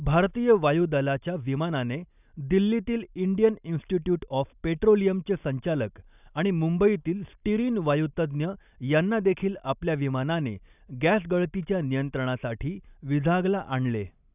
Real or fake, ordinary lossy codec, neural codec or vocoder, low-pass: real; none; none; 3.6 kHz